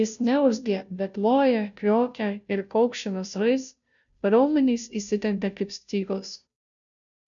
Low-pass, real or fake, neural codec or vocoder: 7.2 kHz; fake; codec, 16 kHz, 0.5 kbps, FunCodec, trained on Chinese and English, 25 frames a second